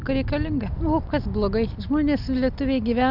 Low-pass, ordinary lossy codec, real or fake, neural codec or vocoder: 5.4 kHz; AAC, 48 kbps; real; none